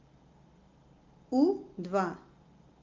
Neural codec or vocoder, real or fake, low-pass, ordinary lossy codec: none; real; 7.2 kHz; Opus, 24 kbps